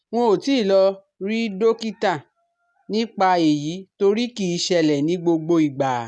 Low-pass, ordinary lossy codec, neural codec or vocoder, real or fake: none; none; none; real